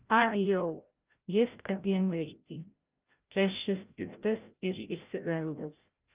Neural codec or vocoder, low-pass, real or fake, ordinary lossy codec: codec, 16 kHz, 0.5 kbps, FreqCodec, larger model; 3.6 kHz; fake; Opus, 24 kbps